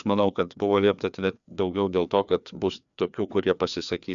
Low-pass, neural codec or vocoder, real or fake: 7.2 kHz; codec, 16 kHz, 2 kbps, FreqCodec, larger model; fake